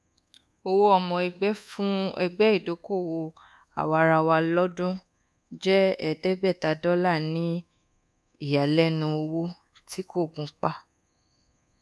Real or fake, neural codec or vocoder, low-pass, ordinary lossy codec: fake; codec, 24 kHz, 1.2 kbps, DualCodec; none; none